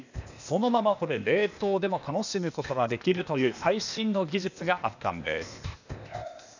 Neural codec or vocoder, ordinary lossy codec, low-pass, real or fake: codec, 16 kHz, 0.8 kbps, ZipCodec; none; 7.2 kHz; fake